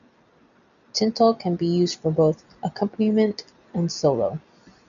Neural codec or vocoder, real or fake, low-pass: none; real; 7.2 kHz